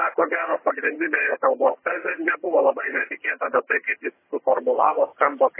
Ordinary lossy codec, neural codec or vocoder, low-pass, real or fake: MP3, 16 kbps; vocoder, 22.05 kHz, 80 mel bands, HiFi-GAN; 3.6 kHz; fake